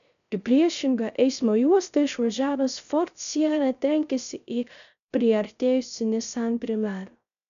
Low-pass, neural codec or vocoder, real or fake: 7.2 kHz; codec, 16 kHz, 0.3 kbps, FocalCodec; fake